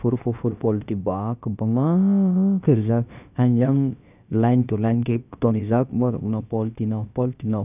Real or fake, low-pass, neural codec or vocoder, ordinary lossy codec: fake; 3.6 kHz; codec, 16 kHz, about 1 kbps, DyCAST, with the encoder's durations; none